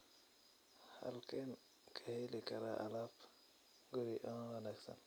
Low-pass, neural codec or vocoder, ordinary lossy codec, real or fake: none; none; none; real